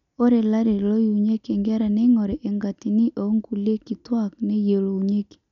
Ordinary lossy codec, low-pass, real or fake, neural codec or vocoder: none; 7.2 kHz; real; none